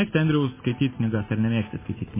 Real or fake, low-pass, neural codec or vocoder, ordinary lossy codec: real; 3.6 kHz; none; MP3, 16 kbps